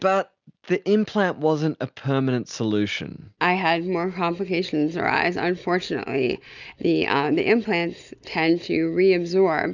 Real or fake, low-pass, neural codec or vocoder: real; 7.2 kHz; none